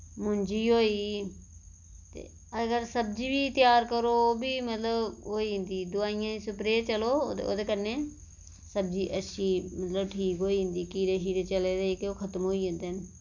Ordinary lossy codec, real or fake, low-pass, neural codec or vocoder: none; real; 7.2 kHz; none